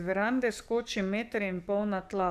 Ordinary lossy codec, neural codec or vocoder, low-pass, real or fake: none; codec, 44.1 kHz, 7.8 kbps, DAC; 14.4 kHz; fake